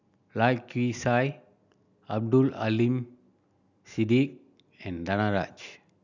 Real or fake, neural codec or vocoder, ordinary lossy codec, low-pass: real; none; none; 7.2 kHz